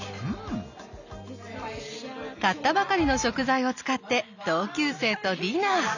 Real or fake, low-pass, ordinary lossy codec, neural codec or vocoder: real; 7.2 kHz; none; none